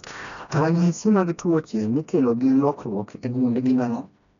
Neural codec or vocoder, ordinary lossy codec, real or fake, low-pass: codec, 16 kHz, 1 kbps, FreqCodec, smaller model; none; fake; 7.2 kHz